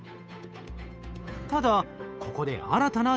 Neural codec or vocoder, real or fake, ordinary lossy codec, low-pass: codec, 16 kHz, 8 kbps, FunCodec, trained on Chinese and English, 25 frames a second; fake; none; none